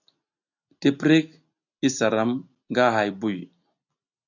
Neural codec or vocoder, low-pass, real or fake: none; 7.2 kHz; real